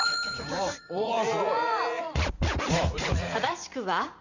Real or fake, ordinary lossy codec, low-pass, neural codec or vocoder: fake; none; 7.2 kHz; vocoder, 44.1 kHz, 80 mel bands, Vocos